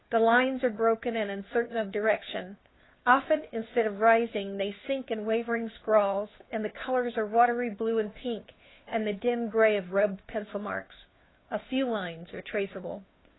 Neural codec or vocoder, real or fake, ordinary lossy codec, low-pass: codec, 16 kHz, 2 kbps, FunCodec, trained on Chinese and English, 25 frames a second; fake; AAC, 16 kbps; 7.2 kHz